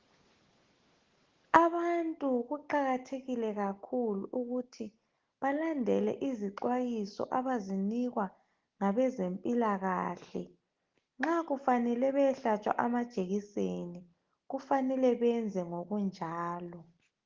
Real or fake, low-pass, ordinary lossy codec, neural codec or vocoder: real; 7.2 kHz; Opus, 16 kbps; none